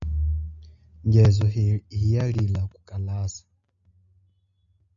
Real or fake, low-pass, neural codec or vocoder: real; 7.2 kHz; none